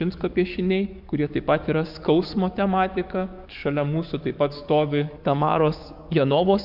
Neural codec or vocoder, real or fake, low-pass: codec, 44.1 kHz, 7.8 kbps, DAC; fake; 5.4 kHz